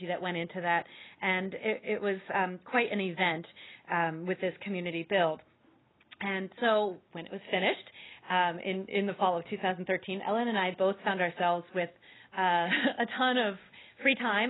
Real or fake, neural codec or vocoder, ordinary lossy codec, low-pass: real; none; AAC, 16 kbps; 7.2 kHz